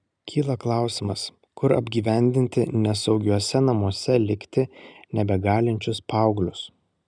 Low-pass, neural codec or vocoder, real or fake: 9.9 kHz; none; real